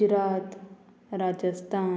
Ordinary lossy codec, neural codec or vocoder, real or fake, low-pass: none; none; real; none